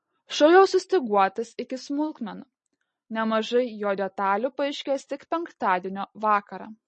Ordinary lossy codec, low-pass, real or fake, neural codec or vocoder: MP3, 32 kbps; 10.8 kHz; real; none